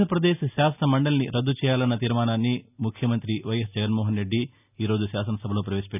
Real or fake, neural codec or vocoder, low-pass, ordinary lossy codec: real; none; 3.6 kHz; none